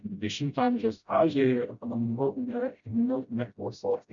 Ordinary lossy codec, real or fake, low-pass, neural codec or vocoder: MP3, 96 kbps; fake; 7.2 kHz; codec, 16 kHz, 0.5 kbps, FreqCodec, smaller model